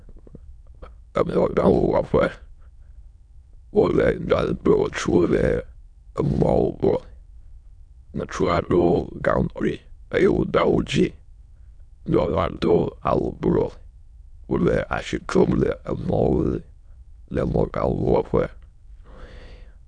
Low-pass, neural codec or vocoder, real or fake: 9.9 kHz; autoencoder, 22.05 kHz, a latent of 192 numbers a frame, VITS, trained on many speakers; fake